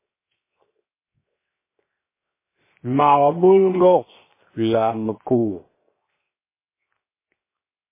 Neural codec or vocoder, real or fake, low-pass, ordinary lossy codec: codec, 16 kHz, 0.7 kbps, FocalCodec; fake; 3.6 kHz; MP3, 16 kbps